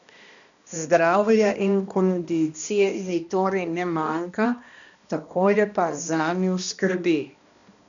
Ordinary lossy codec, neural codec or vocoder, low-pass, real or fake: none; codec, 16 kHz, 1 kbps, X-Codec, HuBERT features, trained on balanced general audio; 7.2 kHz; fake